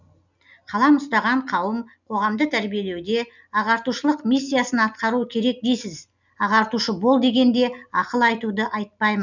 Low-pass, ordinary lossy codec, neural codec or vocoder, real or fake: 7.2 kHz; none; none; real